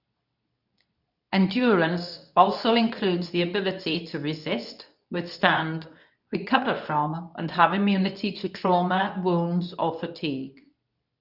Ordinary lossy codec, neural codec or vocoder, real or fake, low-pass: none; codec, 24 kHz, 0.9 kbps, WavTokenizer, medium speech release version 2; fake; 5.4 kHz